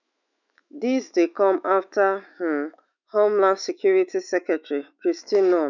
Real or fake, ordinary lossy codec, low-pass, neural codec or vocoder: fake; none; 7.2 kHz; autoencoder, 48 kHz, 128 numbers a frame, DAC-VAE, trained on Japanese speech